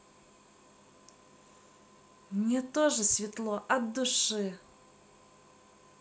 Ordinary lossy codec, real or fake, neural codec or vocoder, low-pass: none; real; none; none